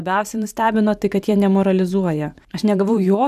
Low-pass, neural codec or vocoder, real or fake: 14.4 kHz; vocoder, 44.1 kHz, 128 mel bands every 512 samples, BigVGAN v2; fake